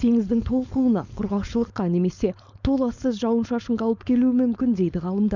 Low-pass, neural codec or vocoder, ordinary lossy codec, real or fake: 7.2 kHz; codec, 16 kHz, 4.8 kbps, FACodec; none; fake